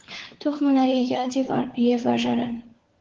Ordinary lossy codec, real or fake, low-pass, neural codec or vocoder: Opus, 16 kbps; fake; 7.2 kHz; codec, 16 kHz, 4 kbps, FunCodec, trained on LibriTTS, 50 frames a second